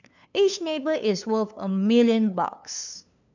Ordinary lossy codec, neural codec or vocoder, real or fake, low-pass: none; codec, 16 kHz, 2 kbps, FunCodec, trained on LibriTTS, 25 frames a second; fake; 7.2 kHz